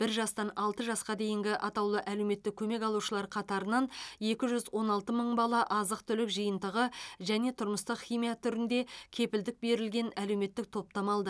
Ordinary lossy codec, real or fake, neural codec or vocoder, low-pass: none; real; none; none